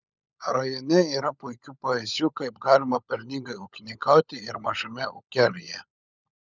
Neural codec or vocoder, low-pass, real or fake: codec, 16 kHz, 16 kbps, FunCodec, trained on LibriTTS, 50 frames a second; 7.2 kHz; fake